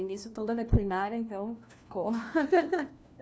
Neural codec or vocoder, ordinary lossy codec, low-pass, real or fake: codec, 16 kHz, 1 kbps, FunCodec, trained on LibriTTS, 50 frames a second; none; none; fake